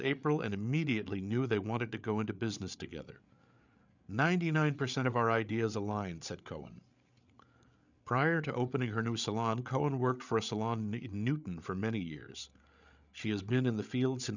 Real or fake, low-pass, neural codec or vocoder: fake; 7.2 kHz; codec, 16 kHz, 8 kbps, FreqCodec, larger model